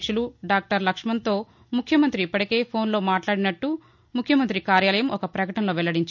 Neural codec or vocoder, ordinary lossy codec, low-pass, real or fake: none; none; 7.2 kHz; real